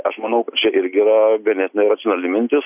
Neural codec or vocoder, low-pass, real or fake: none; 3.6 kHz; real